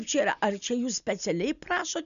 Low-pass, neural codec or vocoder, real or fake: 7.2 kHz; none; real